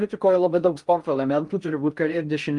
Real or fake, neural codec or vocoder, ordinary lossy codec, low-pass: fake; codec, 16 kHz in and 24 kHz out, 0.6 kbps, FocalCodec, streaming, 4096 codes; Opus, 32 kbps; 10.8 kHz